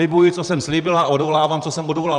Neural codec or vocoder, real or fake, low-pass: vocoder, 44.1 kHz, 128 mel bands, Pupu-Vocoder; fake; 10.8 kHz